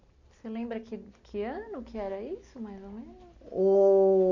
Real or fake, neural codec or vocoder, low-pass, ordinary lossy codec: real; none; 7.2 kHz; none